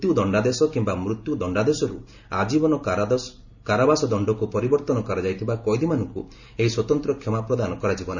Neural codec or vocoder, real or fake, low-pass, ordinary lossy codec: none; real; 7.2 kHz; none